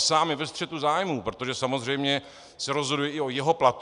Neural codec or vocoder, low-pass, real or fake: none; 10.8 kHz; real